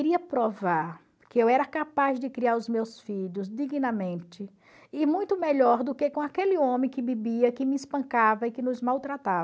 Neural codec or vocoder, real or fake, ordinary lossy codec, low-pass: none; real; none; none